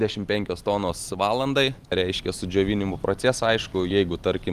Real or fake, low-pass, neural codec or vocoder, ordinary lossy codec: real; 14.4 kHz; none; Opus, 32 kbps